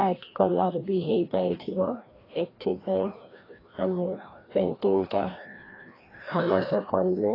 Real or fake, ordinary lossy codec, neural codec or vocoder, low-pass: fake; AAC, 24 kbps; codec, 16 kHz, 1 kbps, FreqCodec, larger model; 5.4 kHz